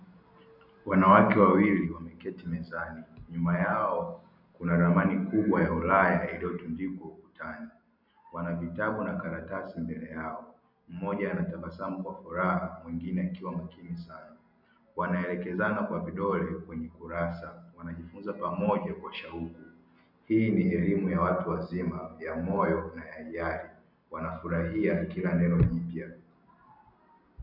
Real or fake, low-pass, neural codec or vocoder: real; 5.4 kHz; none